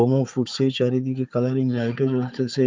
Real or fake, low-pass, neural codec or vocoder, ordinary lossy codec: fake; 7.2 kHz; codec, 16 kHz, 4 kbps, FunCodec, trained on Chinese and English, 50 frames a second; Opus, 24 kbps